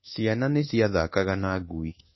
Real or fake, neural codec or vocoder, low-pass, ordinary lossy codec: fake; codec, 24 kHz, 1.2 kbps, DualCodec; 7.2 kHz; MP3, 24 kbps